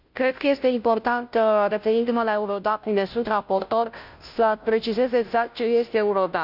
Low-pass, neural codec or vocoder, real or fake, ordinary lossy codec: 5.4 kHz; codec, 16 kHz, 0.5 kbps, FunCodec, trained on Chinese and English, 25 frames a second; fake; none